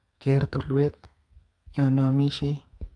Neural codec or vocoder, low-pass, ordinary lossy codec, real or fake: codec, 32 kHz, 1.9 kbps, SNAC; 9.9 kHz; none; fake